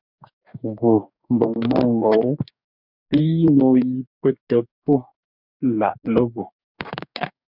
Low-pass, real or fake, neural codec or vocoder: 5.4 kHz; fake; codec, 44.1 kHz, 2.6 kbps, DAC